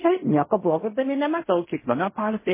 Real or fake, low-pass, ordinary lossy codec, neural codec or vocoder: fake; 3.6 kHz; MP3, 16 kbps; codec, 16 kHz in and 24 kHz out, 0.4 kbps, LongCat-Audio-Codec, fine tuned four codebook decoder